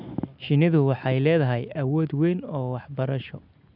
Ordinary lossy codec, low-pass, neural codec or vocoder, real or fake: none; 5.4 kHz; none; real